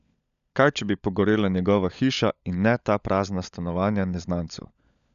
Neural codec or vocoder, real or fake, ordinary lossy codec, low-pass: codec, 16 kHz, 16 kbps, FunCodec, trained on LibriTTS, 50 frames a second; fake; none; 7.2 kHz